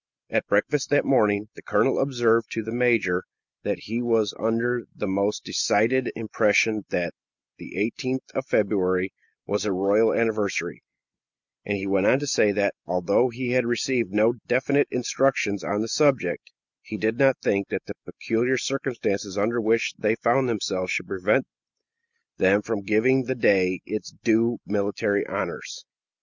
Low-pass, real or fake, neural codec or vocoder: 7.2 kHz; real; none